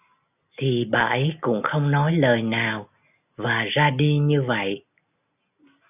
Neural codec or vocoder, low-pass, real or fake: none; 3.6 kHz; real